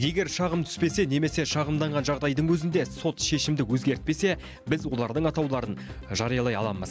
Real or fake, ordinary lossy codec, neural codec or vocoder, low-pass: real; none; none; none